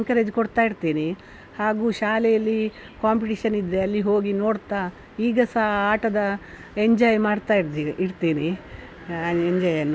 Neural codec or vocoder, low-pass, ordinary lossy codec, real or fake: none; none; none; real